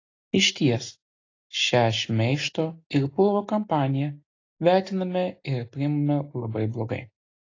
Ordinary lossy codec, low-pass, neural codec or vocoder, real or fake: AAC, 32 kbps; 7.2 kHz; none; real